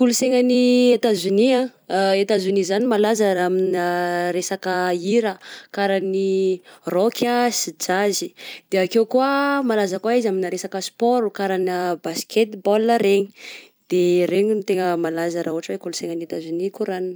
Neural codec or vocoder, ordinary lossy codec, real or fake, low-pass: vocoder, 44.1 kHz, 128 mel bands every 256 samples, BigVGAN v2; none; fake; none